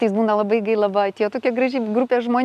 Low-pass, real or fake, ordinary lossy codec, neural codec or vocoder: 14.4 kHz; real; AAC, 96 kbps; none